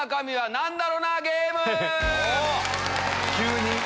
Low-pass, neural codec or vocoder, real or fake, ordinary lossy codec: none; none; real; none